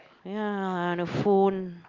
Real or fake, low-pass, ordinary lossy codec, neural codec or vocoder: real; 7.2 kHz; Opus, 24 kbps; none